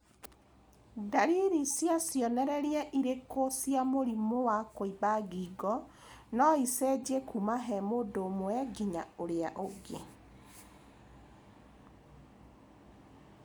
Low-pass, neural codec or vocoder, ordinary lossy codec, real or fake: none; none; none; real